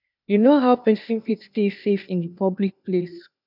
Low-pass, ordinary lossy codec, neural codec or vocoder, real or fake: 5.4 kHz; none; codec, 16 kHz, 0.8 kbps, ZipCodec; fake